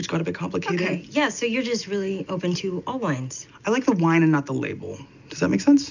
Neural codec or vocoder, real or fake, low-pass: none; real; 7.2 kHz